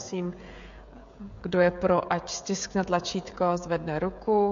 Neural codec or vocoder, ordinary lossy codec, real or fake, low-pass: codec, 16 kHz, 16 kbps, FreqCodec, smaller model; MP3, 48 kbps; fake; 7.2 kHz